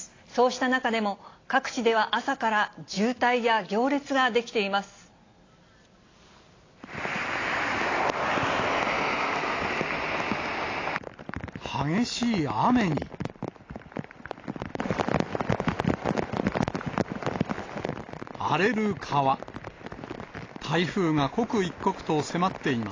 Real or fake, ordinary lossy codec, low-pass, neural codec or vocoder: real; AAC, 32 kbps; 7.2 kHz; none